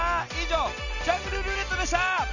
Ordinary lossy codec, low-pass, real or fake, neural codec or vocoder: none; 7.2 kHz; real; none